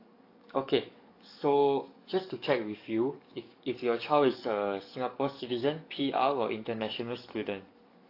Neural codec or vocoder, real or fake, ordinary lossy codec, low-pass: codec, 44.1 kHz, 7.8 kbps, DAC; fake; AAC, 32 kbps; 5.4 kHz